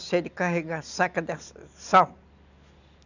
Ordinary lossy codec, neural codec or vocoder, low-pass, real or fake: none; none; 7.2 kHz; real